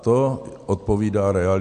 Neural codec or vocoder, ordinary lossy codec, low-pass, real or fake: none; MP3, 64 kbps; 10.8 kHz; real